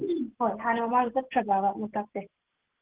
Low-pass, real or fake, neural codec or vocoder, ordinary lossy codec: 3.6 kHz; real; none; Opus, 16 kbps